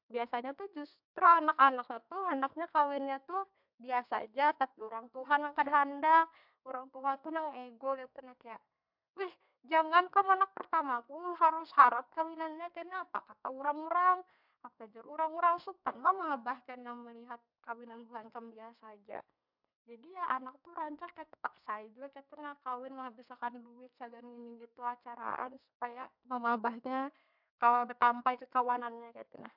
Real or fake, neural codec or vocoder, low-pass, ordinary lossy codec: fake; codec, 32 kHz, 1.9 kbps, SNAC; 5.4 kHz; MP3, 48 kbps